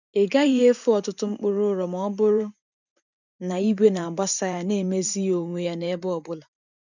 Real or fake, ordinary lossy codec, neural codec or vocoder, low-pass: fake; none; vocoder, 44.1 kHz, 128 mel bands every 512 samples, BigVGAN v2; 7.2 kHz